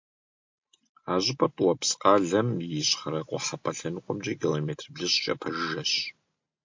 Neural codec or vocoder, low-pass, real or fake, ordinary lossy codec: none; 7.2 kHz; real; MP3, 48 kbps